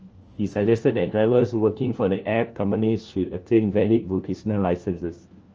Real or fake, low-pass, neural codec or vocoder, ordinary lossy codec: fake; 7.2 kHz; codec, 16 kHz, 1 kbps, FunCodec, trained on LibriTTS, 50 frames a second; Opus, 24 kbps